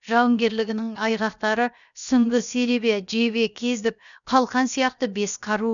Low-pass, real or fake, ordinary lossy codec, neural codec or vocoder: 7.2 kHz; fake; none; codec, 16 kHz, about 1 kbps, DyCAST, with the encoder's durations